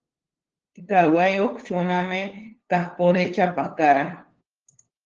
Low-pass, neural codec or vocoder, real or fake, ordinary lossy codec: 7.2 kHz; codec, 16 kHz, 2 kbps, FunCodec, trained on LibriTTS, 25 frames a second; fake; Opus, 16 kbps